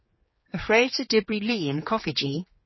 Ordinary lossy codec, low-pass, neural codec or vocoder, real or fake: MP3, 24 kbps; 7.2 kHz; codec, 16 kHz, 4 kbps, X-Codec, HuBERT features, trained on general audio; fake